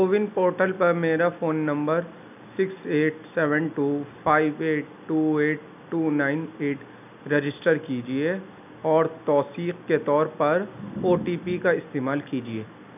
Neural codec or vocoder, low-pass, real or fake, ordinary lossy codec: none; 3.6 kHz; real; none